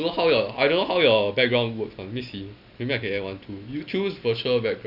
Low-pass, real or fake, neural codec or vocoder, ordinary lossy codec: 5.4 kHz; real; none; none